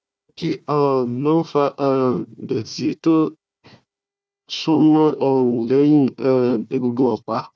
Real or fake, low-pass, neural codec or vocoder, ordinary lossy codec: fake; none; codec, 16 kHz, 1 kbps, FunCodec, trained on Chinese and English, 50 frames a second; none